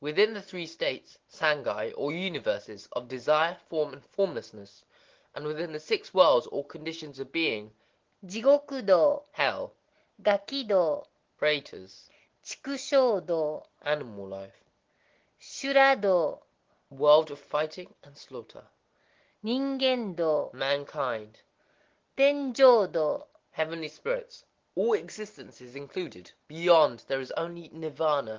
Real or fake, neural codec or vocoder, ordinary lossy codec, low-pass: real; none; Opus, 16 kbps; 7.2 kHz